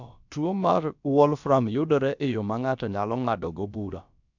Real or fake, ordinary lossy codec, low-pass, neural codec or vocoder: fake; none; 7.2 kHz; codec, 16 kHz, about 1 kbps, DyCAST, with the encoder's durations